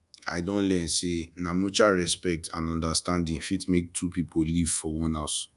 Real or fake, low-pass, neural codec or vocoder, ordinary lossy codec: fake; 10.8 kHz; codec, 24 kHz, 1.2 kbps, DualCodec; none